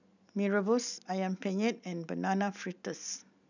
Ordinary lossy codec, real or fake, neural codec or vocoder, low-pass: none; real; none; 7.2 kHz